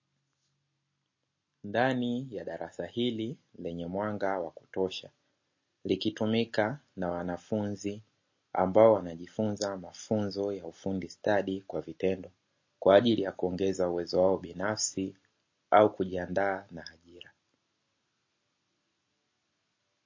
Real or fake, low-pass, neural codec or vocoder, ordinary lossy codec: real; 7.2 kHz; none; MP3, 32 kbps